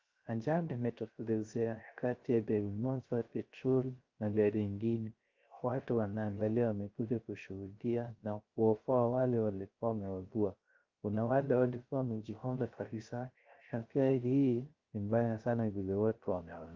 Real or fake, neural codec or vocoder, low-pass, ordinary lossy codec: fake; codec, 16 kHz, 0.3 kbps, FocalCodec; 7.2 kHz; Opus, 24 kbps